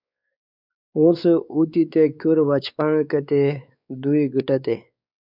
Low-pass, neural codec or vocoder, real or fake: 5.4 kHz; codec, 16 kHz, 4 kbps, X-Codec, WavLM features, trained on Multilingual LibriSpeech; fake